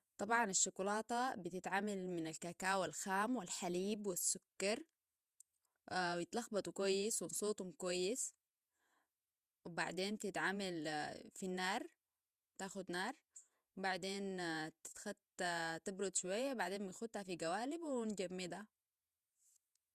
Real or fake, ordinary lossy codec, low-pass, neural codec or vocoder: fake; Opus, 64 kbps; 14.4 kHz; vocoder, 48 kHz, 128 mel bands, Vocos